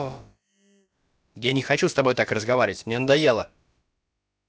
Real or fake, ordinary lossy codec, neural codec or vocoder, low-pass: fake; none; codec, 16 kHz, about 1 kbps, DyCAST, with the encoder's durations; none